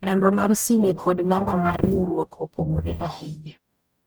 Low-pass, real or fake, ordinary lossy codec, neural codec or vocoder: none; fake; none; codec, 44.1 kHz, 0.9 kbps, DAC